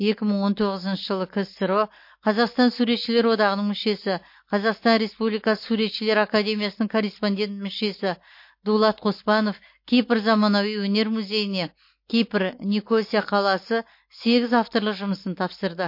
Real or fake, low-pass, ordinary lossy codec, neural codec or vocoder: real; 5.4 kHz; MP3, 32 kbps; none